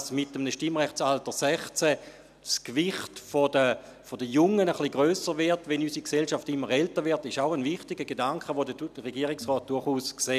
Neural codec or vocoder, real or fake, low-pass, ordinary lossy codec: none; real; 14.4 kHz; none